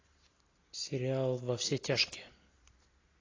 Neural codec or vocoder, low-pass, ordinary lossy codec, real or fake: none; 7.2 kHz; AAC, 32 kbps; real